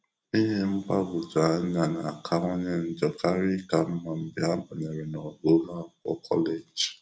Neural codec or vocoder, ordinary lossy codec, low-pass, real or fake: none; none; none; real